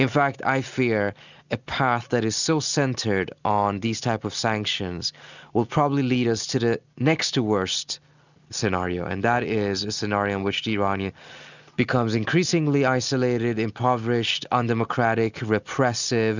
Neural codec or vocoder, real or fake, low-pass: none; real; 7.2 kHz